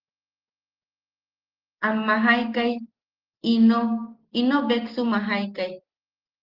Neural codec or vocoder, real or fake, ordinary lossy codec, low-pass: none; real; Opus, 16 kbps; 5.4 kHz